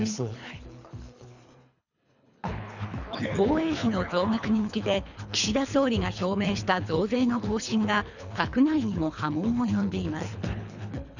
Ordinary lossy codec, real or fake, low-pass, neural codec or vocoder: none; fake; 7.2 kHz; codec, 24 kHz, 3 kbps, HILCodec